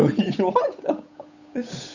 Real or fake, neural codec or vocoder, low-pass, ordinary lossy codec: fake; codec, 16 kHz, 8 kbps, FunCodec, trained on Chinese and English, 25 frames a second; 7.2 kHz; none